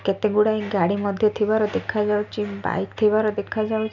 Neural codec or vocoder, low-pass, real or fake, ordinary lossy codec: none; 7.2 kHz; real; none